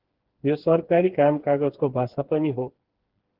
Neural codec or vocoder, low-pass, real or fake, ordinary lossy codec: codec, 16 kHz, 4 kbps, FreqCodec, smaller model; 5.4 kHz; fake; Opus, 16 kbps